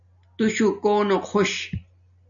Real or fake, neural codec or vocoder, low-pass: real; none; 7.2 kHz